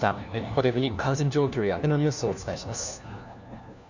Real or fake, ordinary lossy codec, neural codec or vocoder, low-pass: fake; none; codec, 16 kHz, 1 kbps, FunCodec, trained on LibriTTS, 50 frames a second; 7.2 kHz